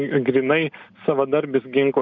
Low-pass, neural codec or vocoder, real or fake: 7.2 kHz; none; real